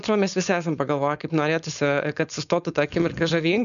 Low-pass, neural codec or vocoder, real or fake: 7.2 kHz; codec, 16 kHz, 4.8 kbps, FACodec; fake